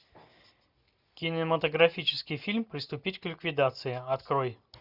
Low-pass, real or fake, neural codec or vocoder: 5.4 kHz; real; none